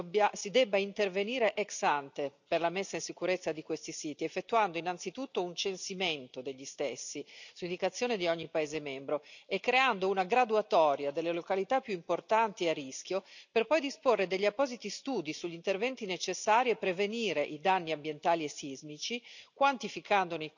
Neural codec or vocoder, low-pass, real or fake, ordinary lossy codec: none; 7.2 kHz; real; none